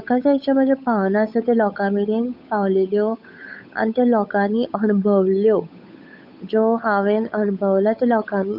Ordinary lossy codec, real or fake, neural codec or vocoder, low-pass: none; fake; codec, 16 kHz, 8 kbps, FunCodec, trained on Chinese and English, 25 frames a second; 5.4 kHz